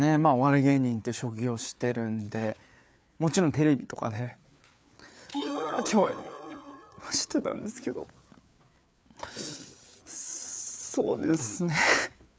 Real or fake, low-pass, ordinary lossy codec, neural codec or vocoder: fake; none; none; codec, 16 kHz, 4 kbps, FreqCodec, larger model